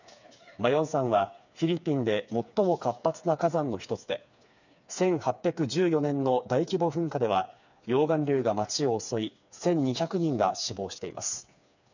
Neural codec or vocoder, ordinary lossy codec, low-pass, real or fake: codec, 16 kHz, 4 kbps, FreqCodec, smaller model; none; 7.2 kHz; fake